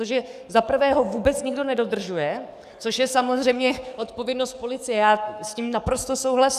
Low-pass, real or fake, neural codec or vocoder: 14.4 kHz; fake; codec, 44.1 kHz, 7.8 kbps, DAC